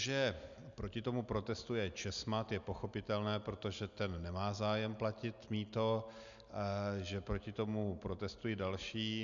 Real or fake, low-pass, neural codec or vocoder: real; 7.2 kHz; none